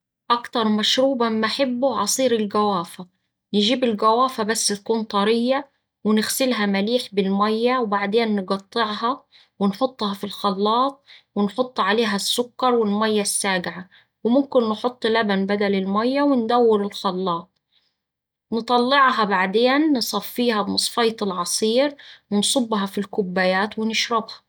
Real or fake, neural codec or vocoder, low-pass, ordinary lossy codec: real; none; none; none